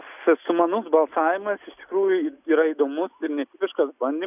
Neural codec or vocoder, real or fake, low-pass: none; real; 3.6 kHz